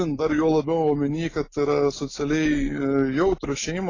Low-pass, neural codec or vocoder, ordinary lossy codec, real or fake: 7.2 kHz; none; AAC, 32 kbps; real